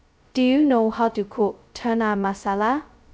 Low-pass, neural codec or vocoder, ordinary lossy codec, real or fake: none; codec, 16 kHz, 0.2 kbps, FocalCodec; none; fake